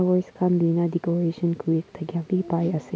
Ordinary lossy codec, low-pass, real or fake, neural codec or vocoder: none; none; real; none